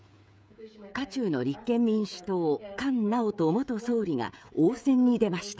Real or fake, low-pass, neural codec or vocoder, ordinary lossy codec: fake; none; codec, 16 kHz, 16 kbps, FreqCodec, smaller model; none